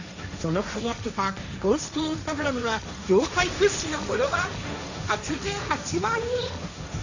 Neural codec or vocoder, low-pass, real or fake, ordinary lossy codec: codec, 16 kHz, 1.1 kbps, Voila-Tokenizer; 7.2 kHz; fake; none